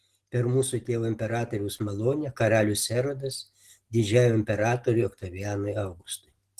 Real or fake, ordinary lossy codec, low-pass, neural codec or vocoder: real; Opus, 16 kbps; 14.4 kHz; none